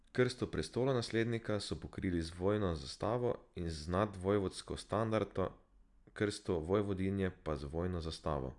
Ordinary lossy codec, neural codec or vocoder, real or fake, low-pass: Opus, 64 kbps; none; real; 10.8 kHz